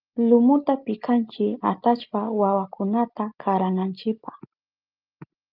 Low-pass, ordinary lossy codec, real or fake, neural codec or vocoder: 5.4 kHz; Opus, 24 kbps; real; none